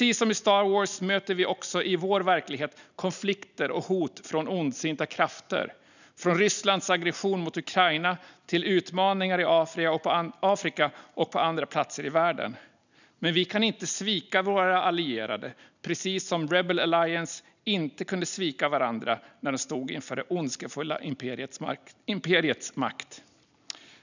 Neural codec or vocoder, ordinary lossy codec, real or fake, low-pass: none; none; real; 7.2 kHz